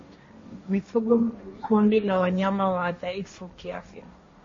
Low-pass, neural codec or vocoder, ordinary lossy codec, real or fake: 7.2 kHz; codec, 16 kHz, 1.1 kbps, Voila-Tokenizer; MP3, 32 kbps; fake